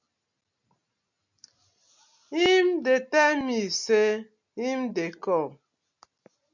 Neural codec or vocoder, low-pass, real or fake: none; 7.2 kHz; real